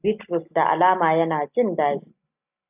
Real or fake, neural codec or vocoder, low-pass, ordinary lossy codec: real; none; 3.6 kHz; AAC, 32 kbps